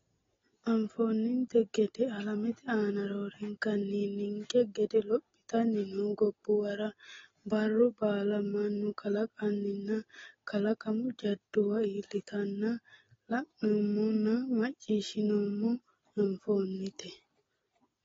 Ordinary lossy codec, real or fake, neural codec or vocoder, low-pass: AAC, 24 kbps; real; none; 7.2 kHz